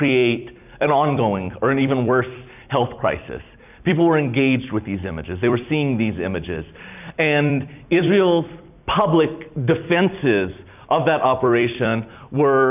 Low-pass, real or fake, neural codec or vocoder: 3.6 kHz; fake; vocoder, 44.1 kHz, 128 mel bands every 256 samples, BigVGAN v2